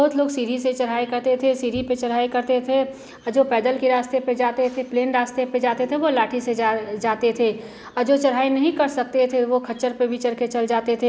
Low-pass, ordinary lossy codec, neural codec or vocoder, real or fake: none; none; none; real